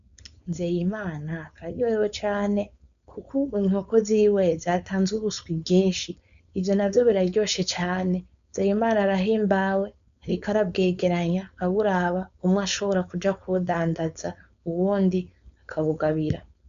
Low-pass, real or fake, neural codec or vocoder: 7.2 kHz; fake; codec, 16 kHz, 4.8 kbps, FACodec